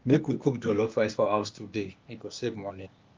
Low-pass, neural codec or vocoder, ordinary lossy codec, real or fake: 7.2 kHz; codec, 16 kHz, 0.8 kbps, ZipCodec; Opus, 24 kbps; fake